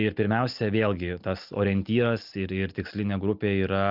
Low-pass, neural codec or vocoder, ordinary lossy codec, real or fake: 5.4 kHz; none; Opus, 24 kbps; real